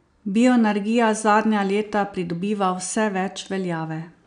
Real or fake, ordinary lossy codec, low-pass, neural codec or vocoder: real; none; 9.9 kHz; none